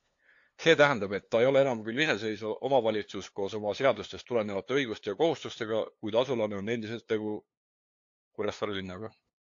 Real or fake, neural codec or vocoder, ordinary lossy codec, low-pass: fake; codec, 16 kHz, 2 kbps, FunCodec, trained on LibriTTS, 25 frames a second; AAC, 48 kbps; 7.2 kHz